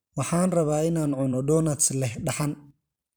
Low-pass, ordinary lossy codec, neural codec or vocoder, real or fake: none; none; vocoder, 44.1 kHz, 128 mel bands every 512 samples, BigVGAN v2; fake